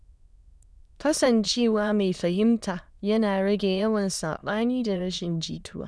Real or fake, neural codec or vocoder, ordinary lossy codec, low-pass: fake; autoencoder, 22.05 kHz, a latent of 192 numbers a frame, VITS, trained on many speakers; none; none